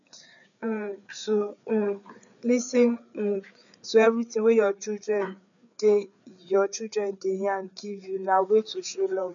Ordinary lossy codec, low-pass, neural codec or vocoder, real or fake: none; 7.2 kHz; codec, 16 kHz, 4 kbps, FreqCodec, larger model; fake